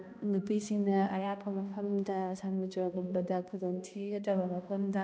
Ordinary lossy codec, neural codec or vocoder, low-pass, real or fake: none; codec, 16 kHz, 1 kbps, X-Codec, HuBERT features, trained on balanced general audio; none; fake